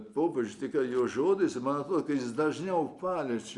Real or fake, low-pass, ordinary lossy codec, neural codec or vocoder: real; 10.8 kHz; Opus, 64 kbps; none